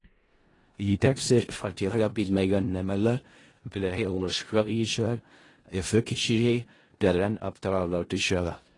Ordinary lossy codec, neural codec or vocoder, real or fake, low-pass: AAC, 32 kbps; codec, 16 kHz in and 24 kHz out, 0.4 kbps, LongCat-Audio-Codec, four codebook decoder; fake; 10.8 kHz